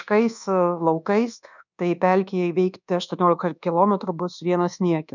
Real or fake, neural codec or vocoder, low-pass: fake; codec, 24 kHz, 1.2 kbps, DualCodec; 7.2 kHz